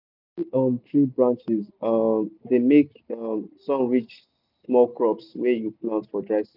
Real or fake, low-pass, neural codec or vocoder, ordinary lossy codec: real; 5.4 kHz; none; none